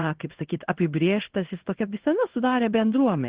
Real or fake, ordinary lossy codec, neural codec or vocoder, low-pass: fake; Opus, 16 kbps; codec, 16 kHz in and 24 kHz out, 1 kbps, XY-Tokenizer; 3.6 kHz